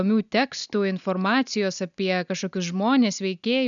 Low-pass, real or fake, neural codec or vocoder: 7.2 kHz; real; none